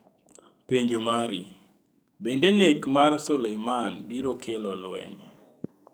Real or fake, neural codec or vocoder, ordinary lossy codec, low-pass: fake; codec, 44.1 kHz, 2.6 kbps, SNAC; none; none